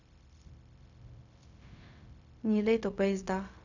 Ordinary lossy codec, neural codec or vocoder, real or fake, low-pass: none; codec, 16 kHz, 0.4 kbps, LongCat-Audio-Codec; fake; 7.2 kHz